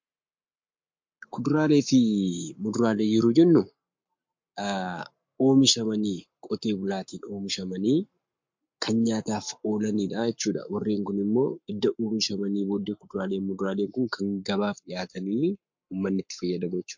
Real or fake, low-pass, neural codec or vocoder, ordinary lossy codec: fake; 7.2 kHz; codec, 44.1 kHz, 7.8 kbps, Pupu-Codec; MP3, 48 kbps